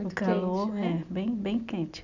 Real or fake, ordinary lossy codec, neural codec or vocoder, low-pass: real; none; none; 7.2 kHz